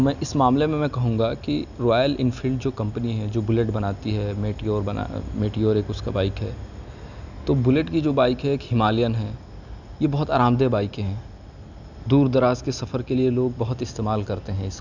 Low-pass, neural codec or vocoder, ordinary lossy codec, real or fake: 7.2 kHz; none; none; real